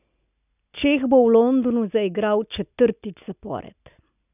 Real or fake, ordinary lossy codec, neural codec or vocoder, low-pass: real; none; none; 3.6 kHz